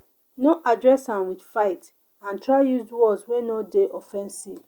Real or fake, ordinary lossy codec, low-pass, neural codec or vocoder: real; Opus, 64 kbps; 19.8 kHz; none